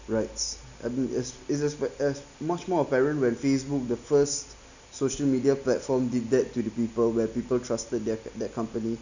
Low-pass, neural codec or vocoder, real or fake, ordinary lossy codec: 7.2 kHz; none; real; none